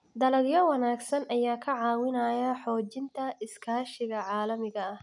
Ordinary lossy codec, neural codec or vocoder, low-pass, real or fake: none; none; 10.8 kHz; real